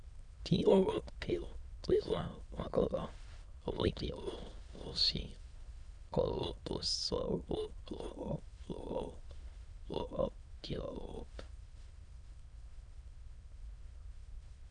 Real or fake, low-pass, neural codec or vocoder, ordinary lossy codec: fake; 9.9 kHz; autoencoder, 22.05 kHz, a latent of 192 numbers a frame, VITS, trained on many speakers; Opus, 64 kbps